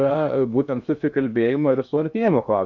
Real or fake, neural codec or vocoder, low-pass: fake; codec, 16 kHz in and 24 kHz out, 0.6 kbps, FocalCodec, streaming, 2048 codes; 7.2 kHz